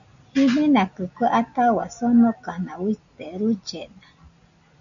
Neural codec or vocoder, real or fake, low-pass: none; real; 7.2 kHz